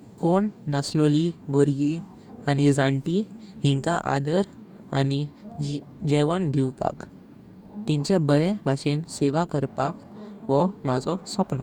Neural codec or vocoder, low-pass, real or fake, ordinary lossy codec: codec, 44.1 kHz, 2.6 kbps, DAC; 19.8 kHz; fake; none